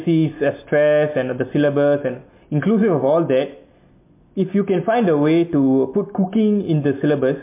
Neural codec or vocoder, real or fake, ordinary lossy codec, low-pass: none; real; MP3, 24 kbps; 3.6 kHz